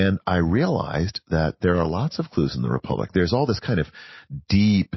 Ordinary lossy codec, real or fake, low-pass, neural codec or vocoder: MP3, 24 kbps; real; 7.2 kHz; none